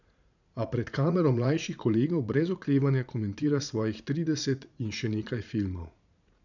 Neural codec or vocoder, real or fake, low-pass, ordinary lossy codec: vocoder, 44.1 kHz, 80 mel bands, Vocos; fake; 7.2 kHz; none